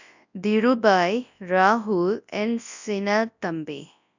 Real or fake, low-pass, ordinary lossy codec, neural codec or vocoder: fake; 7.2 kHz; none; codec, 24 kHz, 0.9 kbps, WavTokenizer, large speech release